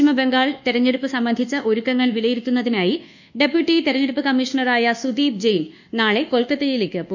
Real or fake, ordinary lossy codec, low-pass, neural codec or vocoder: fake; none; 7.2 kHz; codec, 24 kHz, 1.2 kbps, DualCodec